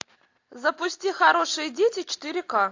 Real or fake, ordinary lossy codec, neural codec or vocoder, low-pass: real; AAC, 48 kbps; none; 7.2 kHz